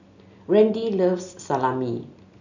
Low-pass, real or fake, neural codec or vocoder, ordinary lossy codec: 7.2 kHz; real; none; none